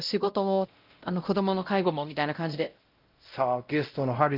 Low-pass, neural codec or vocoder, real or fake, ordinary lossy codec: 5.4 kHz; codec, 16 kHz, 0.5 kbps, X-Codec, WavLM features, trained on Multilingual LibriSpeech; fake; Opus, 24 kbps